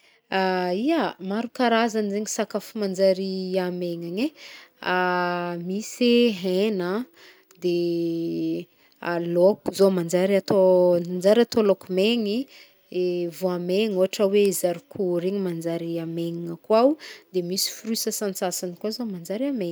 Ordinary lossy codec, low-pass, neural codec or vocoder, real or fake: none; none; none; real